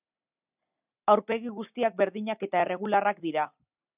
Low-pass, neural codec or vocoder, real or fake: 3.6 kHz; none; real